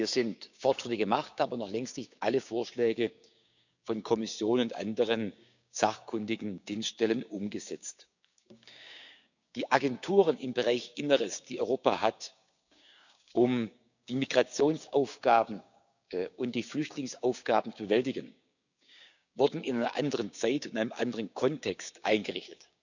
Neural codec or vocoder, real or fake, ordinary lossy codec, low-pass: codec, 16 kHz, 6 kbps, DAC; fake; none; 7.2 kHz